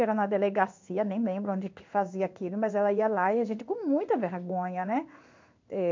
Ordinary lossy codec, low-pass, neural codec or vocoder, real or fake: MP3, 48 kbps; 7.2 kHz; codec, 16 kHz in and 24 kHz out, 1 kbps, XY-Tokenizer; fake